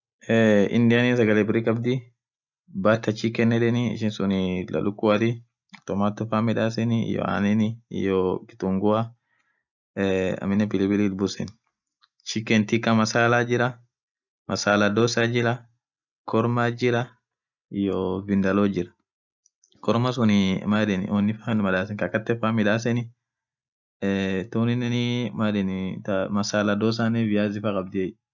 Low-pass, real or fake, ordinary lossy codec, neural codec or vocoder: 7.2 kHz; real; none; none